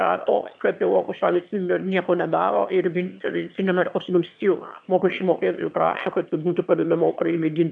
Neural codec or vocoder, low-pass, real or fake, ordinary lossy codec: autoencoder, 22.05 kHz, a latent of 192 numbers a frame, VITS, trained on one speaker; 9.9 kHz; fake; AAC, 64 kbps